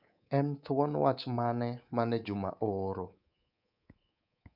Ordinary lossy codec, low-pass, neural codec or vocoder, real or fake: none; 5.4 kHz; codec, 24 kHz, 3.1 kbps, DualCodec; fake